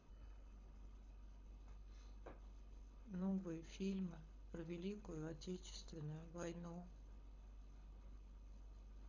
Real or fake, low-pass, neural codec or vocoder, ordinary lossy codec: fake; 7.2 kHz; codec, 24 kHz, 6 kbps, HILCodec; none